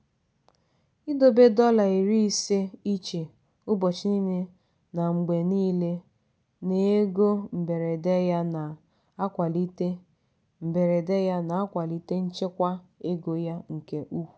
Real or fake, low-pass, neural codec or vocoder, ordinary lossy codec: real; none; none; none